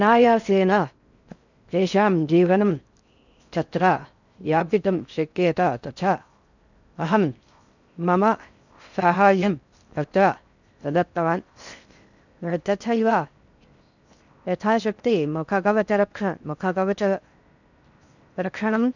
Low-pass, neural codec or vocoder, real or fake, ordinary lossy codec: 7.2 kHz; codec, 16 kHz in and 24 kHz out, 0.6 kbps, FocalCodec, streaming, 4096 codes; fake; none